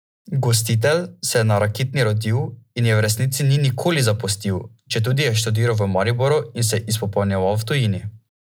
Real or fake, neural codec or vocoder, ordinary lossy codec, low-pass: real; none; none; none